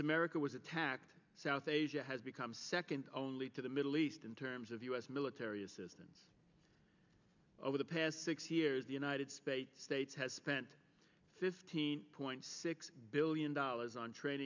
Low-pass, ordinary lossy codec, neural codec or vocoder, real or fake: 7.2 kHz; MP3, 64 kbps; none; real